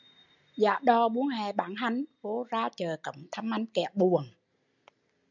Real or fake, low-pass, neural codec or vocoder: real; 7.2 kHz; none